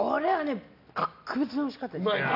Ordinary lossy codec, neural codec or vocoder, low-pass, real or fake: none; none; 5.4 kHz; real